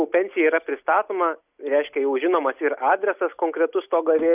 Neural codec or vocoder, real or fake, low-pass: none; real; 3.6 kHz